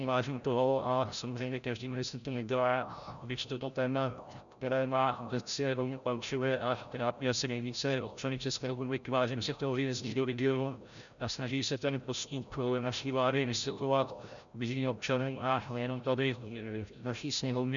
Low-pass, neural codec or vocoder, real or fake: 7.2 kHz; codec, 16 kHz, 0.5 kbps, FreqCodec, larger model; fake